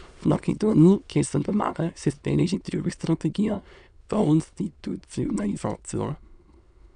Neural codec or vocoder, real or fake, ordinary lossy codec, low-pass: autoencoder, 22.05 kHz, a latent of 192 numbers a frame, VITS, trained on many speakers; fake; none; 9.9 kHz